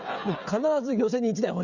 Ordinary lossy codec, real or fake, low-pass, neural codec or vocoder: Opus, 64 kbps; fake; 7.2 kHz; codec, 16 kHz, 16 kbps, FreqCodec, smaller model